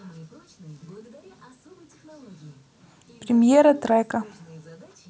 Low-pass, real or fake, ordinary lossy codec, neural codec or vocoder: none; real; none; none